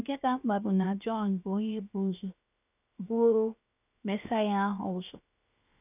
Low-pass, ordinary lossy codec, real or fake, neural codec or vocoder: 3.6 kHz; none; fake; codec, 16 kHz, 0.7 kbps, FocalCodec